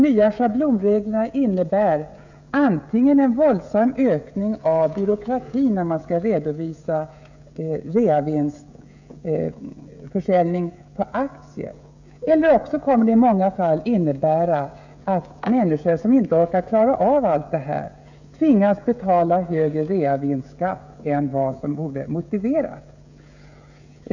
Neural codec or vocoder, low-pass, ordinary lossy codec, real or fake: codec, 16 kHz, 16 kbps, FreqCodec, smaller model; 7.2 kHz; none; fake